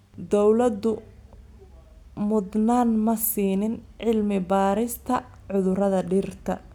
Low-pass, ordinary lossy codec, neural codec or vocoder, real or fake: 19.8 kHz; none; none; real